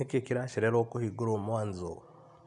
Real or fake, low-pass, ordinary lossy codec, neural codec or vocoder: real; 10.8 kHz; none; none